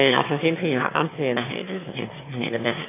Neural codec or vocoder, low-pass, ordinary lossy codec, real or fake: autoencoder, 22.05 kHz, a latent of 192 numbers a frame, VITS, trained on one speaker; 3.6 kHz; AAC, 24 kbps; fake